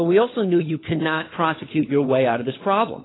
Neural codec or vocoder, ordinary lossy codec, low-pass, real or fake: codec, 16 kHz, 4 kbps, FunCodec, trained on LibriTTS, 50 frames a second; AAC, 16 kbps; 7.2 kHz; fake